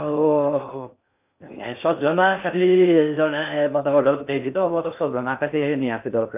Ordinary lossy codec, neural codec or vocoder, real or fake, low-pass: none; codec, 16 kHz in and 24 kHz out, 0.6 kbps, FocalCodec, streaming, 4096 codes; fake; 3.6 kHz